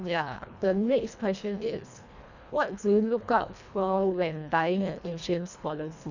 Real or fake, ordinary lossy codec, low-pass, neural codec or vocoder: fake; none; 7.2 kHz; codec, 24 kHz, 1.5 kbps, HILCodec